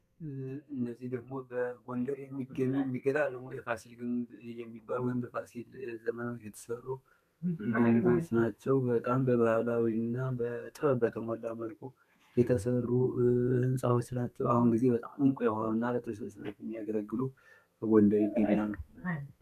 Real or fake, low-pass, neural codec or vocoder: fake; 14.4 kHz; codec, 32 kHz, 1.9 kbps, SNAC